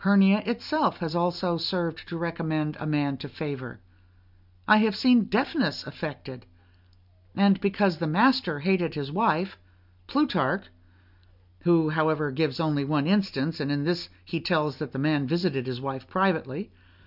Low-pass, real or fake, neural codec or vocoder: 5.4 kHz; real; none